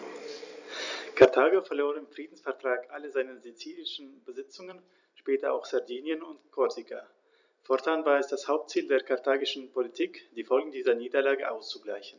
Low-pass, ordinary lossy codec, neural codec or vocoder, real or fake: 7.2 kHz; none; vocoder, 44.1 kHz, 128 mel bands every 256 samples, BigVGAN v2; fake